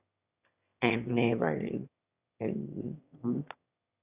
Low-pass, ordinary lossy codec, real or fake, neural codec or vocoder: 3.6 kHz; Opus, 64 kbps; fake; autoencoder, 22.05 kHz, a latent of 192 numbers a frame, VITS, trained on one speaker